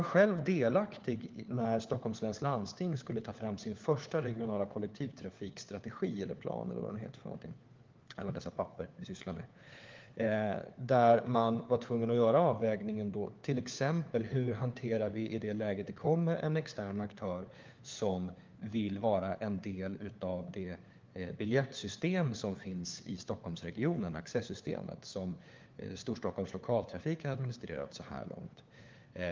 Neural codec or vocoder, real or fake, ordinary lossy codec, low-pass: codec, 16 kHz, 4 kbps, FunCodec, trained on LibriTTS, 50 frames a second; fake; Opus, 32 kbps; 7.2 kHz